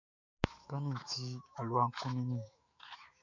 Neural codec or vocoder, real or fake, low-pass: autoencoder, 48 kHz, 128 numbers a frame, DAC-VAE, trained on Japanese speech; fake; 7.2 kHz